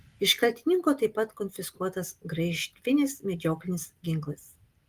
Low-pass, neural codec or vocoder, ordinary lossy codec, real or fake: 14.4 kHz; none; Opus, 24 kbps; real